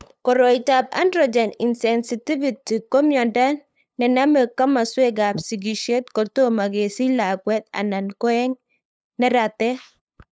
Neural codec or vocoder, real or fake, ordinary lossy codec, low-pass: codec, 16 kHz, 8 kbps, FunCodec, trained on LibriTTS, 25 frames a second; fake; none; none